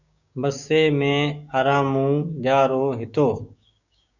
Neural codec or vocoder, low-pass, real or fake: codec, 16 kHz, 6 kbps, DAC; 7.2 kHz; fake